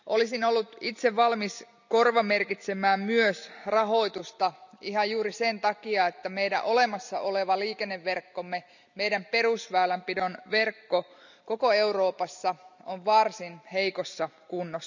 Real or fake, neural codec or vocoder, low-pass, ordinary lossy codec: real; none; 7.2 kHz; none